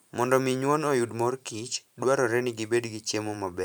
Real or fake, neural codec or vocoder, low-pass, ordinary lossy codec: fake; vocoder, 44.1 kHz, 128 mel bands every 512 samples, BigVGAN v2; none; none